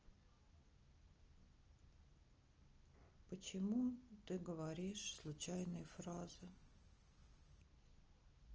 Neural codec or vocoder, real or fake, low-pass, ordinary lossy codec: none; real; 7.2 kHz; Opus, 24 kbps